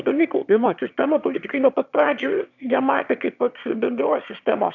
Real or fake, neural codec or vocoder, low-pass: fake; autoencoder, 22.05 kHz, a latent of 192 numbers a frame, VITS, trained on one speaker; 7.2 kHz